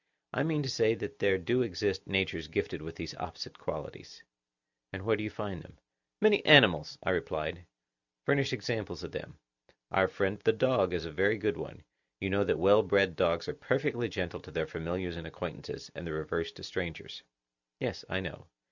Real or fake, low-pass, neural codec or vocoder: real; 7.2 kHz; none